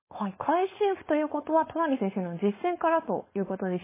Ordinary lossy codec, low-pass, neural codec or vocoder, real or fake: MP3, 16 kbps; 3.6 kHz; codec, 16 kHz, 4.8 kbps, FACodec; fake